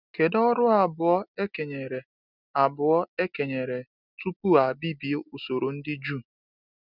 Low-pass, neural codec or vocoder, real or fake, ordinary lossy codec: 5.4 kHz; none; real; none